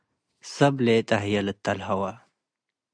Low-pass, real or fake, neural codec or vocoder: 9.9 kHz; real; none